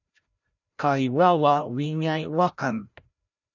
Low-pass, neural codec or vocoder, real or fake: 7.2 kHz; codec, 16 kHz, 0.5 kbps, FreqCodec, larger model; fake